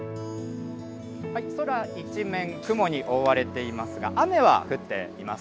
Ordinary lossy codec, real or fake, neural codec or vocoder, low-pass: none; real; none; none